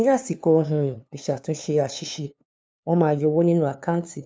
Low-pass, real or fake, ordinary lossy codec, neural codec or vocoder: none; fake; none; codec, 16 kHz, 2 kbps, FunCodec, trained on LibriTTS, 25 frames a second